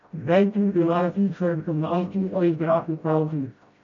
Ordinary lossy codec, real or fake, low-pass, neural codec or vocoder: MP3, 48 kbps; fake; 7.2 kHz; codec, 16 kHz, 0.5 kbps, FreqCodec, smaller model